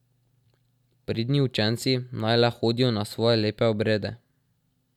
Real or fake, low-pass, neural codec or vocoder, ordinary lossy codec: real; 19.8 kHz; none; none